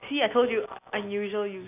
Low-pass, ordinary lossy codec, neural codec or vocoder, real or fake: 3.6 kHz; none; none; real